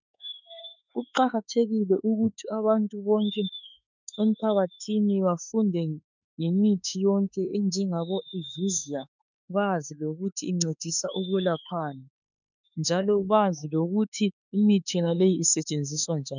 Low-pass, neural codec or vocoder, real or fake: 7.2 kHz; autoencoder, 48 kHz, 32 numbers a frame, DAC-VAE, trained on Japanese speech; fake